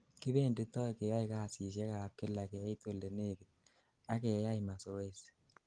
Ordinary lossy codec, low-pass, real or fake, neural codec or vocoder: Opus, 24 kbps; 9.9 kHz; real; none